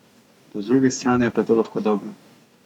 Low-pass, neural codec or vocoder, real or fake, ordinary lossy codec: 19.8 kHz; codec, 44.1 kHz, 2.6 kbps, DAC; fake; none